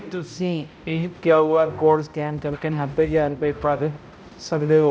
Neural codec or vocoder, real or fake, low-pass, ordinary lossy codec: codec, 16 kHz, 0.5 kbps, X-Codec, HuBERT features, trained on balanced general audio; fake; none; none